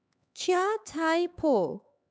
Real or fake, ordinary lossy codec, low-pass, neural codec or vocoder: fake; none; none; codec, 16 kHz, 4 kbps, X-Codec, HuBERT features, trained on LibriSpeech